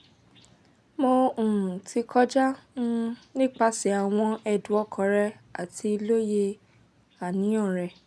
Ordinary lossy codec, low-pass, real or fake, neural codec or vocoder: none; none; real; none